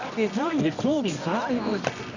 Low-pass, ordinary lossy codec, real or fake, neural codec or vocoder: 7.2 kHz; none; fake; codec, 24 kHz, 0.9 kbps, WavTokenizer, medium music audio release